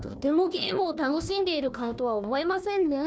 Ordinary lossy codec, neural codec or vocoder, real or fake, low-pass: none; codec, 16 kHz, 1 kbps, FunCodec, trained on Chinese and English, 50 frames a second; fake; none